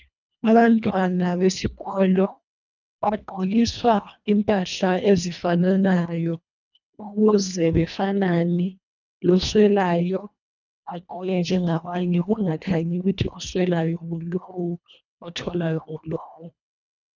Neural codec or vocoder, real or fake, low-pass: codec, 24 kHz, 1.5 kbps, HILCodec; fake; 7.2 kHz